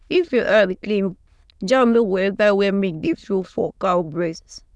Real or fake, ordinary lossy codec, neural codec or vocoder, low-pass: fake; none; autoencoder, 22.05 kHz, a latent of 192 numbers a frame, VITS, trained on many speakers; none